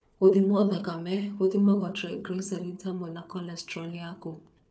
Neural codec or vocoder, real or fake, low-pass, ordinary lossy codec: codec, 16 kHz, 4 kbps, FunCodec, trained on Chinese and English, 50 frames a second; fake; none; none